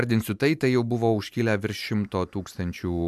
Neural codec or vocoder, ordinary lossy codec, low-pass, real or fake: none; MP3, 96 kbps; 14.4 kHz; real